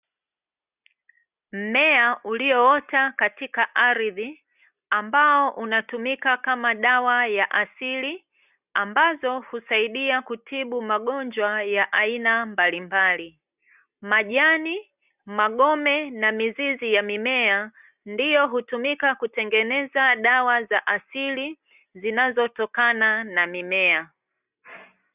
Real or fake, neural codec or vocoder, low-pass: real; none; 3.6 kHz